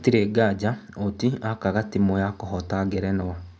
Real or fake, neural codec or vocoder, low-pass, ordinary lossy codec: real; none; none; none